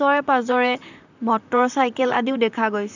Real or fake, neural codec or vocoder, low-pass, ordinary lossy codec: fake; vocoder, 44.1 kHz, 128 mel bands, Pupu-Vocoder; 7.2 kHz; none